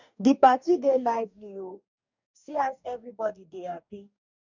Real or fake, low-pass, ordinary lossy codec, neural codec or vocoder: fake; 7.2 kHz; none; codec, 44.1 kHz, 2.6 kbps, DAC